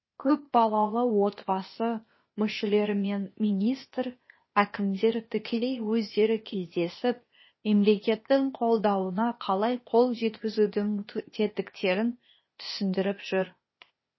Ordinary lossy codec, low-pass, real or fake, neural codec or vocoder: MP3, 24 kbps; 7.2 kHz; fake; codec, 16 kHz, 0.8 kbps, ZipCodec